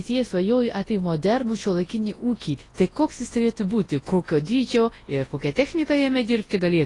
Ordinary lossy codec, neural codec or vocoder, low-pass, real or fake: AAC, 32 kbps; codec, 24 kHz, 0.9 kbps, WavTokenizer, large speech release; 10.8 kHz; fake